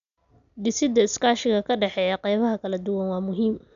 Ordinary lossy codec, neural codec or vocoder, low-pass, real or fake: none; none; 7.2 kHz; real